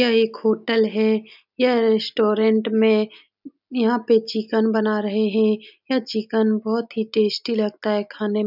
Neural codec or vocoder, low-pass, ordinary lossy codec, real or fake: none; 5.4 kHz; none; real